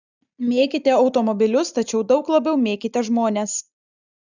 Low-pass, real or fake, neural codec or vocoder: 7.2 kHz; real; none